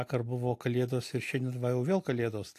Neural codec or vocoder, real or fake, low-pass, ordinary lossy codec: none; real; 14.4 kHz; AAC, 64 kbps